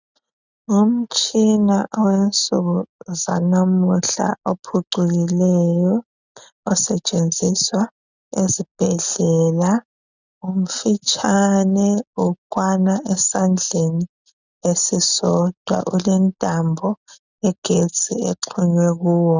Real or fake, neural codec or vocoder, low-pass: real; none; 7.2 kHz